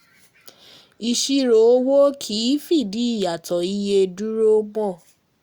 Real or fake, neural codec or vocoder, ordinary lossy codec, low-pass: real; none; Opus, 64 kbps; 19.8 kHz